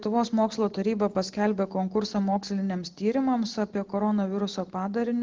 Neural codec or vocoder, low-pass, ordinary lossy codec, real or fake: none; 7.2 kHz; Opus, 16 kbps; real